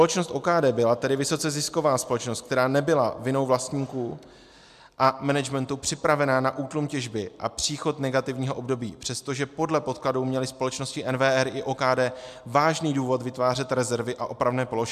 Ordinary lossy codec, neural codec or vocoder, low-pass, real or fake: MP3, 96 kbps; none; 14.4 kHz; real